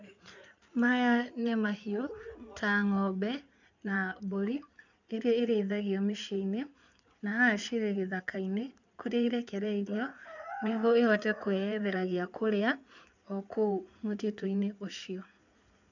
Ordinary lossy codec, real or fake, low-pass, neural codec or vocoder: none; fake; 7.2 kHz; codec, 16 kHz in and 24 kHz out, 2.2 kbps, FireRedTTS-2 codec